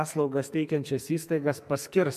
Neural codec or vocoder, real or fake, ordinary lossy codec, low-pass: codec, 44.1 kHz, 2.6 kbps, SNAC; fake; MP3, 96 kbps; 14.4 kHz